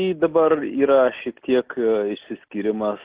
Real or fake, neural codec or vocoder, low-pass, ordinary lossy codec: real; none; 3.6 kHz; Opus, 16 kbps